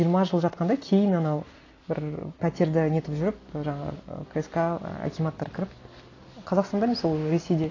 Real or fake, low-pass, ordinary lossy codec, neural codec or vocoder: real; 7.2 kHz; AAC, 32 kbps; none